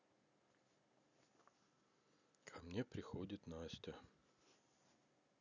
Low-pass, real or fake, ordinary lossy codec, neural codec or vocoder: 7.2 kHz; real; none; none